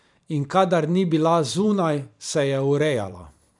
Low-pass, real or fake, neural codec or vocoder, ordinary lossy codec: 10.8 kHz; fake; vocoder, 44.1 kHz, 128 mel bands every 512 samples, BigVGAN v2; none